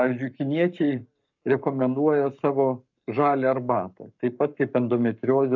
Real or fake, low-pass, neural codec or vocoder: fake; 7.2 kHz; codec, 44.1 kHz, 7.8 kbps, Pupu-Codec